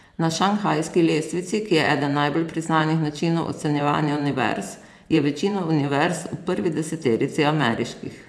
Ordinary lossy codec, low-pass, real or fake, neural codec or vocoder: none; none; fake; vocoder, 24 kHz, 100 mel bands, Vocos